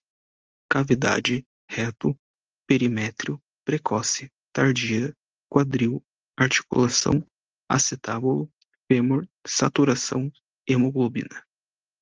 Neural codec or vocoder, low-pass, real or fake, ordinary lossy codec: none; 7.2 kHz; real; Opus, 24 kbps